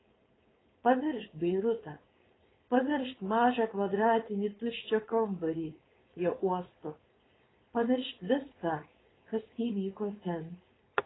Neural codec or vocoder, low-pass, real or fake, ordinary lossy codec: codec, 16 kHz, 4.8 kbps, FACodec; 7.2 kHz; fake; AAC, 16 kbps